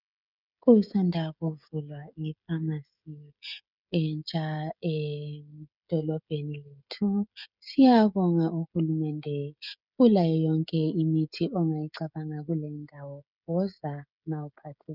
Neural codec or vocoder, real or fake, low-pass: codec, 16 kHz, 16 kbps, FreqCodec, smaller model; fake; 5.4 kHz